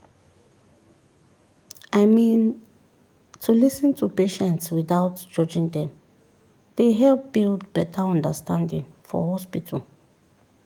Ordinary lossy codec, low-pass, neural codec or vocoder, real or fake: Opus, 32 kbps; 19.8 kHz; autoencoder, 48 kHz, 128 numbers a frame, DAC-VAE, trained on Japanese speech; fake